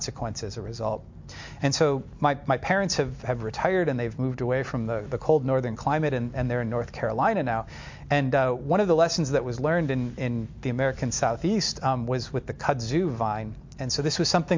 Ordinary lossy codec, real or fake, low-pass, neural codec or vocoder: MP3, 48 kbps; real; 7.2 kHz; none